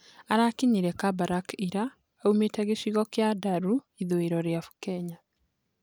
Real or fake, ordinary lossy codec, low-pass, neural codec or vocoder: real; none; none; none